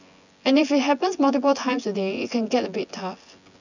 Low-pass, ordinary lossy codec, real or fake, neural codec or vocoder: 7.2 kHz; none; fake; vocoder, 24 kHz, 100 mel bands, Vocos